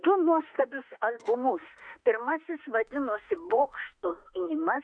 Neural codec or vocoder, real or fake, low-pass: autoencoder, 48 kHz, 32 numbers a frame, DAC-VAE, trained on Japanese speech; fake; 9.9 kHz